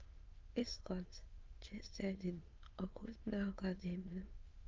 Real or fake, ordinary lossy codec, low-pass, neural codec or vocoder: fake; Opus, 24 kbps; 7.2 kHz; autoencoder, 22.05 kHz, a latent of 192 numbers a frame, VITS, trained on many speakers